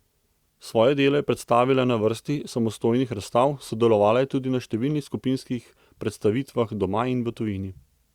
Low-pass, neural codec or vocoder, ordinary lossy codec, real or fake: 19.8 kHz; none; Opus, 64 kbps; real